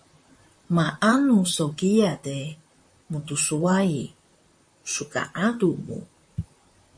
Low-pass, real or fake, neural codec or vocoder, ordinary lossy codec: 9.9 kHz; fake; vocoder, 44.1 kHz, 128 mel bands, Pupu-Vocoder; MP3, 48 kbps